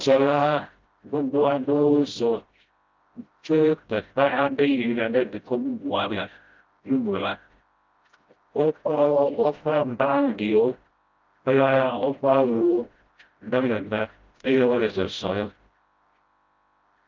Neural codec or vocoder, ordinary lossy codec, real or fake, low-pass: codec, 16 kHz, 0.5 kbps, FreqCodec, smaller model; Opus, 24 kbps; fake; 7.2 kHz